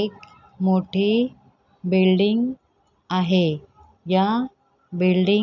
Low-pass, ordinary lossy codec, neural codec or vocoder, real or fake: 7.2 kHz; none; none; real